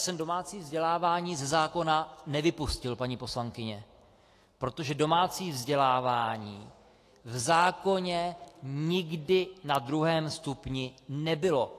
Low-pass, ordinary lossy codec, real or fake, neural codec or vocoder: 14.4 kHz; AAC, 48 kbps; fake; autoencoder, 48 kHz, 128 numbers a frame, DAC-VAE, trained on Japanese speech